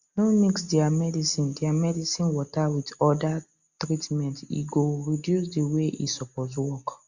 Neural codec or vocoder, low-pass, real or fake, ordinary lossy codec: none; 7.2 kHz; real; Opus, 64 kbps